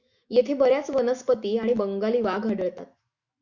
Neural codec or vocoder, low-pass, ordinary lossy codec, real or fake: autoencoder, 48 kHz, 128 numbers a frame, DAC-VAE, trained on Japanese speech; 7.2 kHz; Opus, 64 kbps; fake